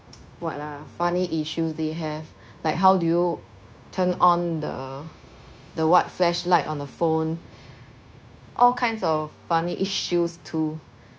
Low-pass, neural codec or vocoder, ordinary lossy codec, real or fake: none; codec, 16 kHz, 0.9 kbps, LongCat-Audio-Codec; none; fake